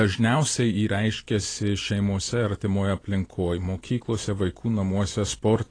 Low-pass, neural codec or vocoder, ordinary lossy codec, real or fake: 9.9 kHz; none; AAC, 32 kbps; real